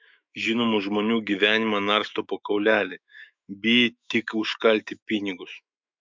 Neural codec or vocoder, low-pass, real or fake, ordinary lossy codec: autoencoder, 48 kHz, 128 numbers a frame, DAC-VAE, trained on Japanese speech; 7.2 kHz; fake; MP3, 64 kbps